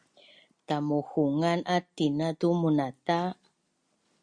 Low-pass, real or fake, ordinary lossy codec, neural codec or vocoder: 9.9 kHz; real; Opus, 64 kbps; none